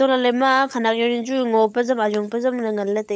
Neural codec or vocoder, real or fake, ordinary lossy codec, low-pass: codec, 16 kHz, 16 kbps, FunCodec, trained on LibriTTS, 50 frames a second; fake; none; none